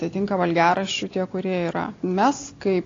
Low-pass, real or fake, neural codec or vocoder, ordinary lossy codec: 7.2 kHz; real; none; AAC, 32 kbps